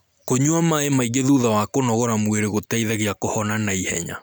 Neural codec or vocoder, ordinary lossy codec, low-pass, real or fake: none; none; none; real